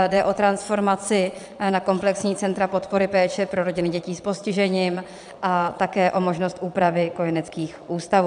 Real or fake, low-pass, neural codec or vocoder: fake; 9.9 kHz; vocoder, 22.05 kHz, 80 mel bands, Vocos